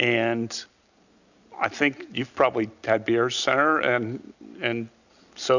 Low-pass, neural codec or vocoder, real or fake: 7.2 kHz; none; real